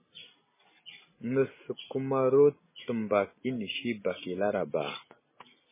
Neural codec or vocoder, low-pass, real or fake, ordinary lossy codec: none; 3.6 kHz; real; MP3, 16 kbps